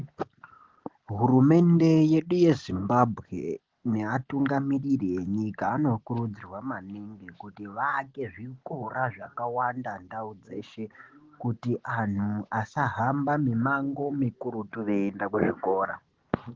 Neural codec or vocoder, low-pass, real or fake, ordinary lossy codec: none; 7.2 kHz; real; Opus, 16 kbps